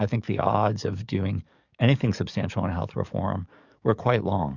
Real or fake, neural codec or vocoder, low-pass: fake; codec, 44.1 kHz, 7.8 kbps, DAC; 7.2 kHz